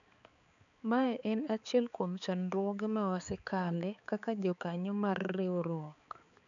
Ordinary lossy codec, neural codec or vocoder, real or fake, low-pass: none; codec, 16 kHz, 4 kbps, X-Codec, HuBERT features, trained on balanced general audio; fake; 7.2 kHz